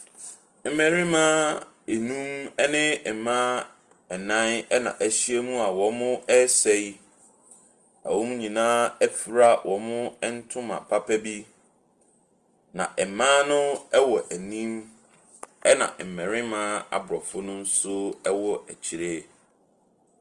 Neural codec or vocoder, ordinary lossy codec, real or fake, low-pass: none; Opus, 24 kbps; real; 10.8 kHz